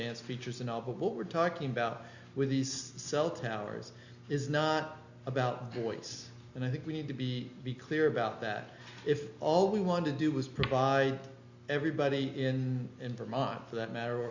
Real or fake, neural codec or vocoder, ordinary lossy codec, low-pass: real; none; Opus, 64 kbps; 7.2 kHz